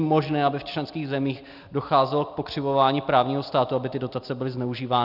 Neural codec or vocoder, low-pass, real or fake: none; 5.4 kHz; real